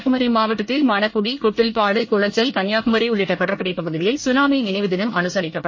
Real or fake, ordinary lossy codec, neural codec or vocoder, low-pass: fake; MP3, 32 kbps; codec, 24 kHz, 1 kbps, SNAC; 7.2 kHz